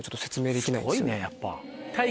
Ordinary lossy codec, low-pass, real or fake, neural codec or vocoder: none; none; real; none